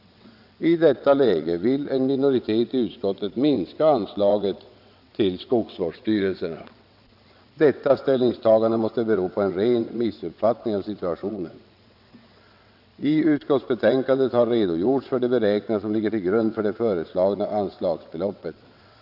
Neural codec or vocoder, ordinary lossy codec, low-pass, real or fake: vocoder, 22.05 kHz, 80 mel bands, WaveNeXt; none; 5.4 kHz; fake